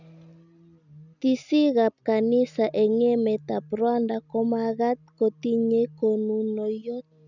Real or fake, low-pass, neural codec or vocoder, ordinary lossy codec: real; 7.2 kHz; none; none